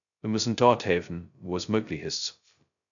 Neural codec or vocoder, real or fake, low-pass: codec, 16 kHz, 0.2 kbps, FocalCodec; fake; 7.2 kHz